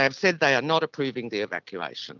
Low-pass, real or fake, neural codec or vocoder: 7.2 kHz; real; none